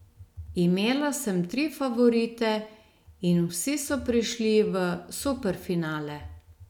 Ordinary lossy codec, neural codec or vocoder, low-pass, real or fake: none; none; 19.8 kHz; real